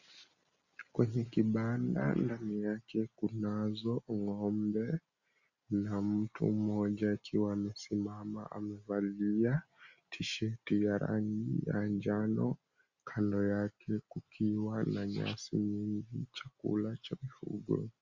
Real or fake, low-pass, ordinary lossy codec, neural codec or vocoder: real; 7.2 kHz; Opus, 64 kbps; none